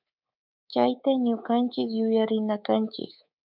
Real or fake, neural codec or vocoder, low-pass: fake; codec, 24 kHz, 3.1 kbps, DualCodec; 5.4 kHz